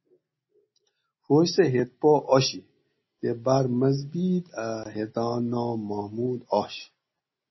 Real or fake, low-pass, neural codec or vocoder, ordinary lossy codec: real; 7.2 kHz; none; MP3, 24 kbps